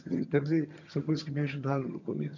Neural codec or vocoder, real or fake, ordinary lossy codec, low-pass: vocoder, 22.05 kHz, 80 mel bands, HiFi-GAN; fake; none; 7.2 kHz